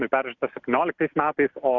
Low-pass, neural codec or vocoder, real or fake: 7.2 kHz; none; real